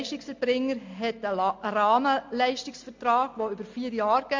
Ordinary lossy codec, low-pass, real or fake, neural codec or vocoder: MP3, 48 kbps; 7.2 kHz; real; none